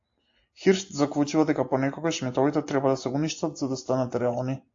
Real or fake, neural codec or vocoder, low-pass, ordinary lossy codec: real; none; 7.2 kHz; AAC, 48 kbps